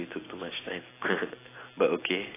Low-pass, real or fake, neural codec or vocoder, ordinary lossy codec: 3.6 kHz; real; none; AAC, 16 kbps